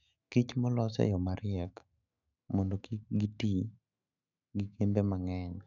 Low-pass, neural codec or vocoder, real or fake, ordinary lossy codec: 7.2 kHz; autoencoder, 48 kHz, 128 numbers a frame, DAC-VAE, trained on Japanese speech; fake; none